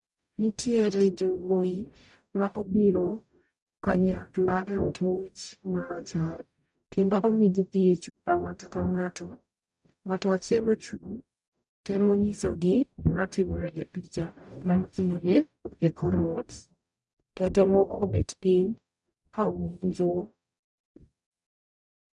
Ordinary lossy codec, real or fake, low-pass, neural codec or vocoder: none; fake; 10.8 kHz; codec, 44.1 kHz, 0.9 kbps, DAC